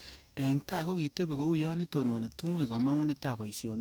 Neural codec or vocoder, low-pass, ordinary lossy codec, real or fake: codec, 44.1 kHz, 2.6 kbps, DAC; none; none; fake